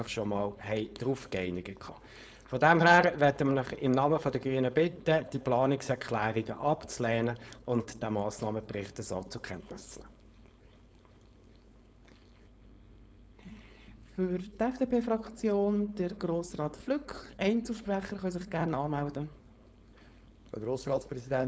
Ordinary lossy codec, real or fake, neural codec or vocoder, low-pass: none; fake; codec, 16 kHz, 4.8 kbps, FACodec; none